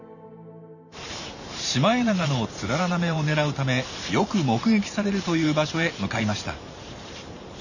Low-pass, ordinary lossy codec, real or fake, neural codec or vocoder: 7.2 kHz; none; fake; vocoder, 44.1 kHz, 128 mel bands every 512 samples, BigVGAN v2